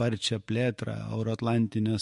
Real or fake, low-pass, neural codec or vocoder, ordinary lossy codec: fake; 14.4 kHz; autoencoder, 48 kHz, 128 numbers a frame, DAC-VAE, trained on Japanese speech; MP3, 48 kbps